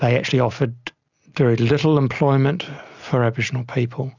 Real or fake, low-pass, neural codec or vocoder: real; 7.2 kHz; none